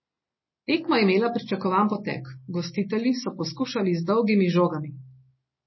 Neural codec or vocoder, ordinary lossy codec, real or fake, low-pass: none; MP3, 24 kbps; real; 7.2 kHz